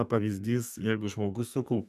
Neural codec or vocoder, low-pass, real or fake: codec, 32 kHz, 1.9 kbps, SNAC; 14.4 kHz; fake